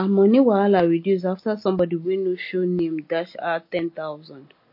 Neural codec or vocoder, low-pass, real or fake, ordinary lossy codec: none; 5.4 kHz; real; MP3, 32 kbps